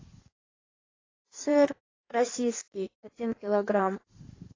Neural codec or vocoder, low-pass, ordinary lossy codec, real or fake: codec, 16 kHz in and 24 kHz out, 1.1 kbps, FireRedTTS-2 codec; 7.2 kHz; AAC, 32 kbps; fake